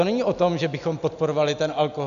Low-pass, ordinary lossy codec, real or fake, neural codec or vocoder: 7.2 kHz; MP3, 64 kbps; real; none